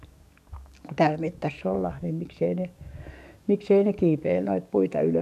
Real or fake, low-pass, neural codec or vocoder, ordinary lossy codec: fake; 14.4 kHz; codec, 44.1 kHz, 7.8 kbps, DAC; none